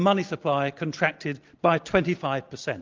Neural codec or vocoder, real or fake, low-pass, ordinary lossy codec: none; real; 7.2 kHz; Opus, 32 kbps